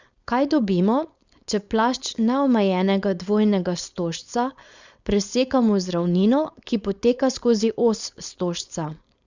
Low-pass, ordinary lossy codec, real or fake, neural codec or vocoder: 7.2 kHz; Opus, 64 kbps; fake; codec, 16 kHz, 4.8 kbps, FACodec